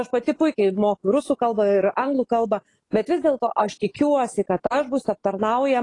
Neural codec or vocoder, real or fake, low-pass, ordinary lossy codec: autoencoder, 48 kHz, 128 numbers a frame, DAC-VAE, trained on Japanese speech; fake; 10.8 kHz; AAC, 32 kbps